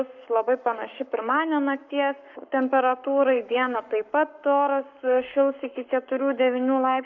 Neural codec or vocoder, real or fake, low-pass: codec, 44.1 kHz, 7.8 kbps, Pupu-Codec; fake; 7.2 kHz